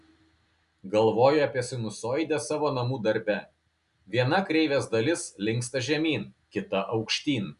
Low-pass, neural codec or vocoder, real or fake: 10.8 kHz; none; real